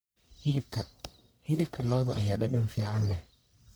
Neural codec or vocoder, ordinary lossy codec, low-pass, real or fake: codec, 44.1 kHz, 1.7 kbps, Pupu-Codec; none; none; fake